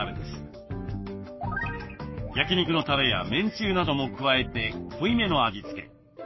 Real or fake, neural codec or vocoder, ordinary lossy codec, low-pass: fake; codec, 44.1 kHz, 7.8 kbps, Pupu-Codec; MP3, 24 kbps; 7.2 kHz